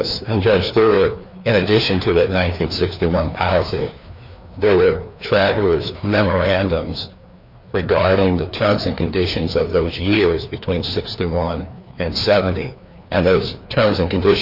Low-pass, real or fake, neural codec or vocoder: 5.4 kHz; fake; codec, 16 kHz, 2 kbps, FreqCodec, larger model